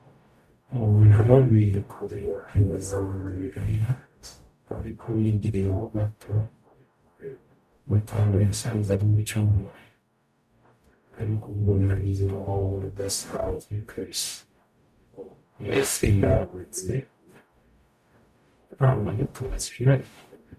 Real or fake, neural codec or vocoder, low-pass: fake; codec, 44.1 kHz, 0.9 kbps, DAC; 14.4 kHz